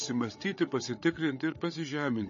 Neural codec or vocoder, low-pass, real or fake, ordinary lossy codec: codec, 16 kHz, 16 kbps, FreqCodec, larger model; 7.2 kHz; fake; MP3, 32 kbps